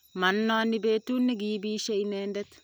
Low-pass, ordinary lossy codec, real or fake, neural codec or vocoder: none; none; real; none